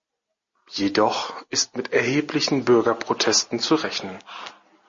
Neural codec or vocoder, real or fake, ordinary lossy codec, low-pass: none; real; MP3, 32 kbps; 7.2 kHz